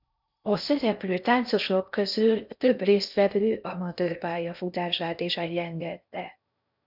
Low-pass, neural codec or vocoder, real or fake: 5.4 kHz; codec, 16 kHz in and 24 kHz out, 0.6 kbps, FocalCodec, streaming, 4096 codes; fake